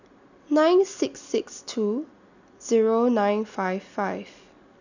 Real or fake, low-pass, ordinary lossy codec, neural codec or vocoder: real; 7.2 kHz; none; none